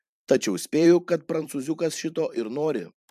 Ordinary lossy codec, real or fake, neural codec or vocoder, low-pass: MP3, 96 kbps; fake; vocoder, 44.1 kHz, 128 mel bands every 512 samples, BigVGAN v2; 14.4 kHz